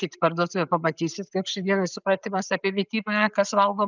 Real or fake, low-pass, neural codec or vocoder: real; 7.2 kHz; none